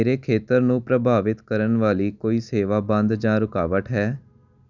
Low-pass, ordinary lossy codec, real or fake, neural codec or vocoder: 7.2 kHz; none; real; none